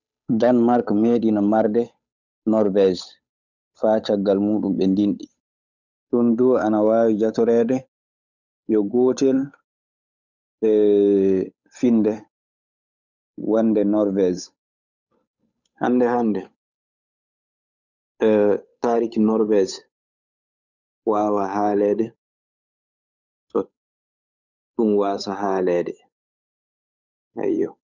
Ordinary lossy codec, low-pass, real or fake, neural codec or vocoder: none; 7.2 kHz; fake; codec, 16 kHz, 8 kbps, FunCodec, trained on Chinese and English, 25 frames a second